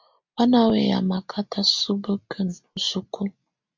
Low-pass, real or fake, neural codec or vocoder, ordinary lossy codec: 7.2 kHz; real; none; MP3, 64 kbps